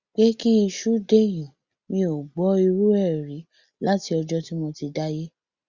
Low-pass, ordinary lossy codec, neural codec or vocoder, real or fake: 7.2 kHz; Opus, 64 kbps; none; real